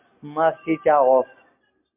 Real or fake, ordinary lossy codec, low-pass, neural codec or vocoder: real; MP3, 32 kbps; 3.6 kHz; none